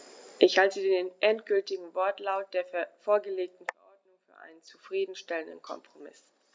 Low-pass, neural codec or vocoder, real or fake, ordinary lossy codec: none; none; real; none